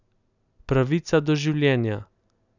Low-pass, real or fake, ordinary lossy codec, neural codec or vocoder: 7.2 kHz; real; none; none